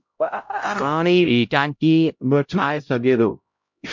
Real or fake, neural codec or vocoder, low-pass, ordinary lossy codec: fake; codec, 16 kHz, 0.5 kbps, X-Codec, HuBERT features, trained on LibriSpeech; 7.2 kHz; MP3, 48 kbps